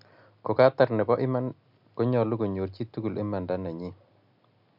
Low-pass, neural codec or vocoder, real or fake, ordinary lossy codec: 5.4 kHz; none; real; none